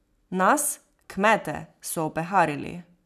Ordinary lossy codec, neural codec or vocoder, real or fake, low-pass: none; none; real; 14.4 kHz